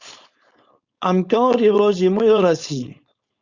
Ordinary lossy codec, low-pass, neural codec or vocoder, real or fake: Opus, 64 kbps; 7.2 kHz; codec, 16 kHz, 4.8 kbps, FACodec; fake